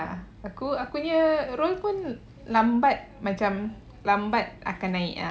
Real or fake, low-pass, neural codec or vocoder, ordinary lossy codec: real; none; none; none